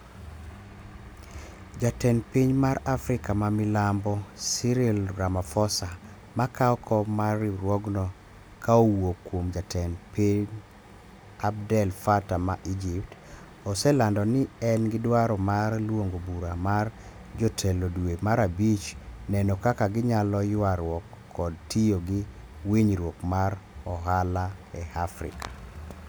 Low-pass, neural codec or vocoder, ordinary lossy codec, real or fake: none; none; none; real